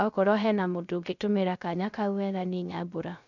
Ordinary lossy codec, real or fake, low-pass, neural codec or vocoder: none; fake; 7.2 kHz; codec, 16 kHz, 0.3 kbps, FocalCodec